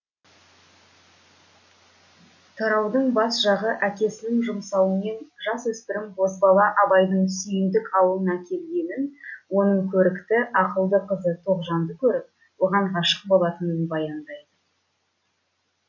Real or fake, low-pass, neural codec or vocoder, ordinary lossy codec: real; 7.2 kHz; none; none